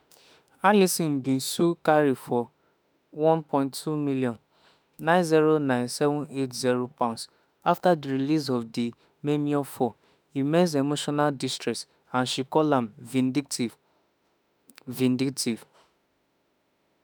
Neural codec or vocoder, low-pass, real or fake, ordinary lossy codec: autoencoder, 48 kHz, 32 numbers a frame, DAC-VAE, trained on Japanese speech; none; fake; none